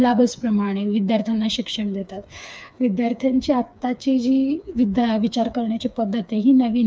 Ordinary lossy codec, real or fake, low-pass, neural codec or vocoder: none; fake; none; codec, 16 kHz, 4 kbps, FreqCodec, smaller model